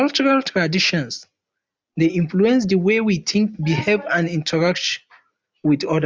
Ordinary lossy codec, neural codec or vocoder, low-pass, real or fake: none; none; none; real